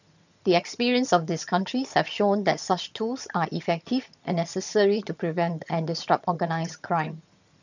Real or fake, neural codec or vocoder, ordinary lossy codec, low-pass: fake; vocoder, 22.05 kHz, 80 mel bands, HiFi-GAN; none; 7.2 kHz